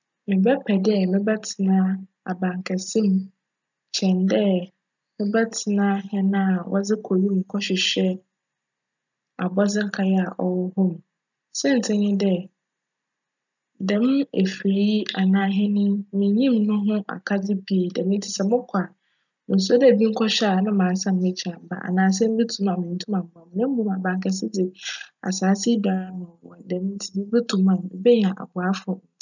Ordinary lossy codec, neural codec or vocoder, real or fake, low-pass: none; none; real; 7.2 kHz